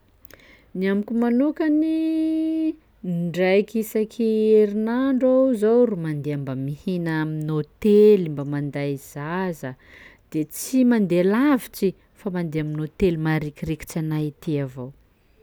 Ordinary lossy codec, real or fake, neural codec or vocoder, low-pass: none; real; none; none